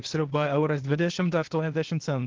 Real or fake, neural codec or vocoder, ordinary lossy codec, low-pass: fake; codec, 16 kHz, 0.8 kbps, ZipCodec; Opus, 16 kbps; 7.2 kHz